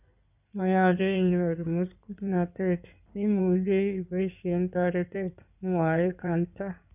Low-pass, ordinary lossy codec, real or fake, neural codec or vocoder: 3.6 kHz; none; fake; codec, 16 kHz in and 24 kHz out, 2.2 kbps, FireRedTTS-2 codec